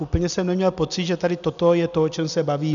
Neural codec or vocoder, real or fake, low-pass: none; real; 7.2 kHz